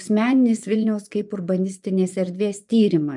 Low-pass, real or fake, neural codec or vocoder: 10.8 kHz; fake; vocoder, 24 kHz, 100 mel bands, Vocos